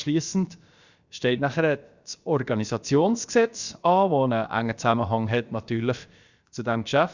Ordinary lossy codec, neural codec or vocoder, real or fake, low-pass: Opus, 64 kbps; codec, 16 kHz, about 1 kbps, DyCAST, with the encoder's durations; fake; 7.2 kHz